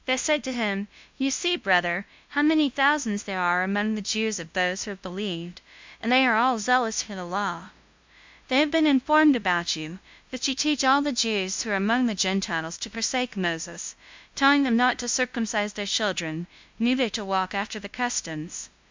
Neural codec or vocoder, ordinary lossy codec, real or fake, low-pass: codec, 16 kHz, 0.5 kbps, FunCodec, trained on LibriTTS, 25 frames a second; MP3, 64 kbps; fake; 7.2 kHz